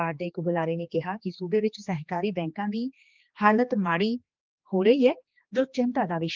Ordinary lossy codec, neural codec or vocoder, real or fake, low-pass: Opus, 32 kbps; codec, 16 kHz, 2 kbps, X-Codec, HuBERT features, trained on general audio; fake; 7.2 kHz